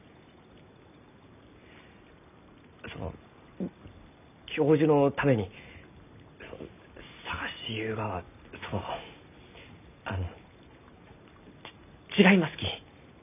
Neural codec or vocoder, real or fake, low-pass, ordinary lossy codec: none; real; 3.6 kHz; none